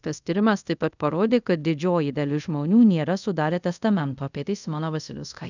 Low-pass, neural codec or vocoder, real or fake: 7.2 kHz; codec, 24 kHz, 0.5 kbps, DualCodec; fake